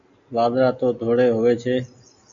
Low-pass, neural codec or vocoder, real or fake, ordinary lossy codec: 7.2 kHz; none; real; MP3, 64 kbps